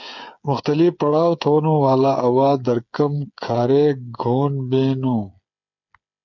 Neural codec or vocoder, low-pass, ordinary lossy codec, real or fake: codec, 16 kHz, 8 kbps, FreqCodec, smaller model; 7.2 kHz; MP3, 64 kbps; fake